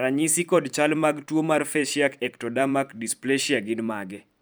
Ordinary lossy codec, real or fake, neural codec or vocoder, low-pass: none; fake; vocoder, 44.1 kHz, 128 mel bands every 512 samples, BigVGAN v2; none